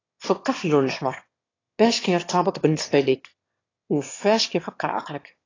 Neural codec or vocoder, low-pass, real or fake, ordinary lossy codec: autoencoder, 22.05 kHz, a latent of 192 numbers a frame, VITS, trained on one speaker; 7.2 kHz; fake; AAC, 32 kbps